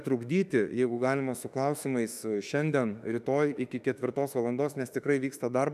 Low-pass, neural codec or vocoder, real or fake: 14.4 kHz; autoencoder, 48 kHz, 32 numbers a frame, DAC-VAE, trained on Japanese speech; fake